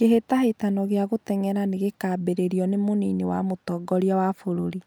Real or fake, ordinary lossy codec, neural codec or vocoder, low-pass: real; none; none; none